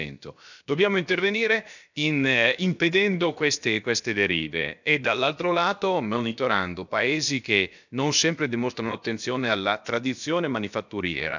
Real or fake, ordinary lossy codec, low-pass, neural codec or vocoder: fake; none; 7.2 kHz; codec, 16 kHz, about 1 kbps, DyCAST, with the encoder's durations